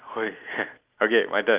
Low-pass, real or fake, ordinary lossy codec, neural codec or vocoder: 3.6 kHz; real; Opus, 32 kbps; none